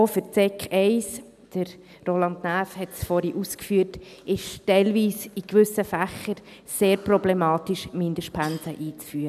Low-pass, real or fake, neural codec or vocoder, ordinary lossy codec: 14.4 kHz; real; none; none